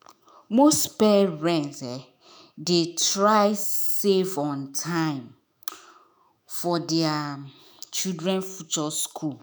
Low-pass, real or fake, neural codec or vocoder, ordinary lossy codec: none; fake; autoencoder, 48 kHz, 128 numbers a frame, DAC-VAE, trained on Japanese speech; none